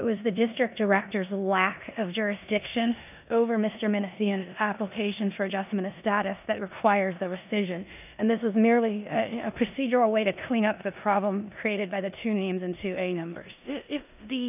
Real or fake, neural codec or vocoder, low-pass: fake; codec, 16 kHz in and 24 kHz out, 0.9 kbps, LongCat-Audio-Codec, four codebook decoder; 3.6 kHz